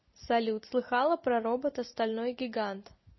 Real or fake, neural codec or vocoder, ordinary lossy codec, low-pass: real; none; MP3, 24 kbps; 7.2 kHz